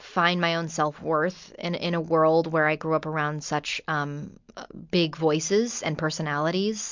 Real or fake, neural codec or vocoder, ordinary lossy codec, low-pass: real; none; MP3, 64 kbps; 7.2 kHz